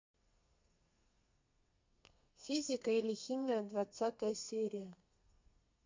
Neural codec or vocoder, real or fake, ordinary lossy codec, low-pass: codec, 32 kHz, 1.9 kbps, SNAC; fake; MP3, 48 kbps; 7.2 kHz